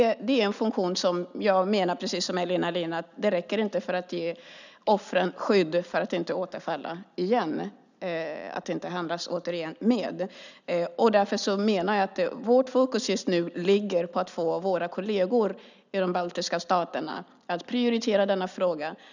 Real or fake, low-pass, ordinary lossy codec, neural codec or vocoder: real; 7.2 kHz; none; none